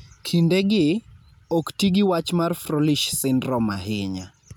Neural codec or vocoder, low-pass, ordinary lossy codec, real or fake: none; none; none; real